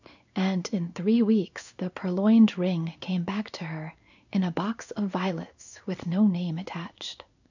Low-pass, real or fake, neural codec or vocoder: 7.2 kHz; fake; codec, 16 kHz in and 24 kHz out, 1 kbps, XY-Tokenizer